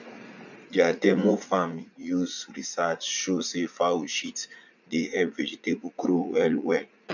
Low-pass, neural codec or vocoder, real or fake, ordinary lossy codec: 7.2 kHz; vocoder, 44.1 kHz, 80 mel bands, Vocos; fake; none